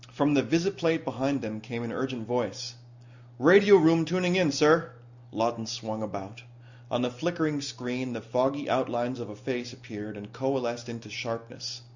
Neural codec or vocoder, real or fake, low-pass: none; real; 7.2 kHz